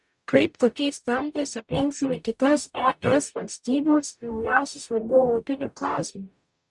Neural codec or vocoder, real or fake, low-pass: codec, 44.1 kHz, 0.9 kbps, DAC; fake; 10.8 kHz